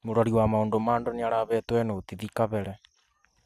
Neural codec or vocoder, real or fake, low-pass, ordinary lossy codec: none; real; 14.4 kHz; AAC, 96 kbps